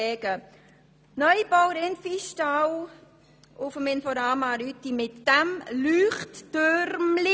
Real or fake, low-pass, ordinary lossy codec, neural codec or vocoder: real; none; none; none